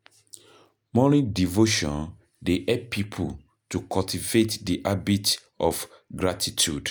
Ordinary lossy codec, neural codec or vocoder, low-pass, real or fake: none; none; none; real